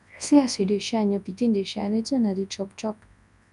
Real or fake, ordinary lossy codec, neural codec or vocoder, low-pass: fake; none; codec, 24 kHz, 0.9 kbps, WavTokenizer, large speech release; 10.8 kHz